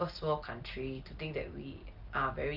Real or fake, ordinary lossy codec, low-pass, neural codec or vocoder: real; Opus, 32 kbps; 5.4 kHz; none